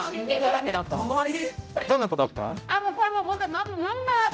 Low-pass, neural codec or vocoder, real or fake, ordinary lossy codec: none; codec, 16 kHz, 0.5 kbps, X-Codec, HuBERT features, trained on general audio; fake; none